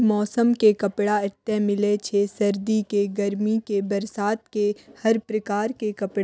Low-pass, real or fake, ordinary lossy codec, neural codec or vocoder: none; real; none; none